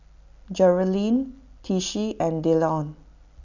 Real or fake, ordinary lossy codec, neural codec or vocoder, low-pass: real; none; none; 7.2 kHz